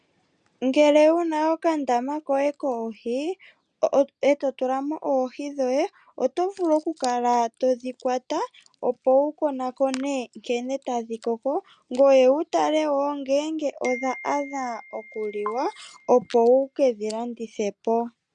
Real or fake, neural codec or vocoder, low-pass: real; none; 9.9 kHz